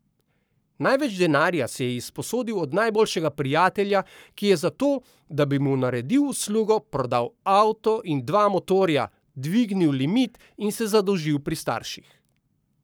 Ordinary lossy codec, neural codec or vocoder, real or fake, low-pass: none; codec, 44.1 kHz, 7.8 kbps, Pupu-Codec; fake; none